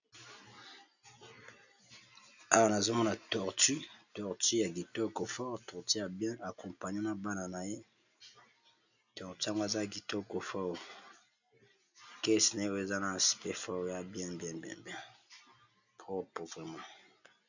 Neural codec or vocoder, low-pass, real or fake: none; 7.2 kHz; real